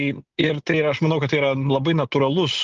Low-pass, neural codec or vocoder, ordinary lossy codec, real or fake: 7.2 kHz; none; Opus, 24 kbps; real